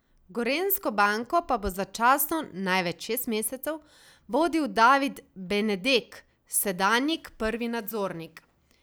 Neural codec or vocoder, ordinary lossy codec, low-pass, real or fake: none; none; none; real